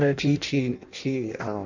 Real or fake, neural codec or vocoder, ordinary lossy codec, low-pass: fake; codec, 16 kHz in and 24 kHz out, 0.6 kbps, FireRedTTS-2 codec; none; 7.2 kHz